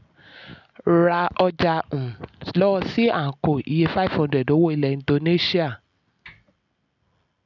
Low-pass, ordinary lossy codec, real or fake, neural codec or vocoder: 7.2 kHz; none; real; none